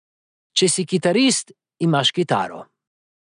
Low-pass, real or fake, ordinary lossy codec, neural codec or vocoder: 9.9 kHz; real; none; none